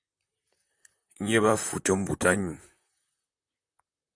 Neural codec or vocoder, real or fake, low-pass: vocoder, 44.1 kHz, 128 mel bands, Pupu-Vocoder; fake; 9.9 kHz